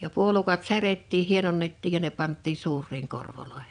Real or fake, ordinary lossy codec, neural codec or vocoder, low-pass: fake; none; vocoder, 22.05 kHz, 80 mel bands, WaveNeXt; 9.9 kHz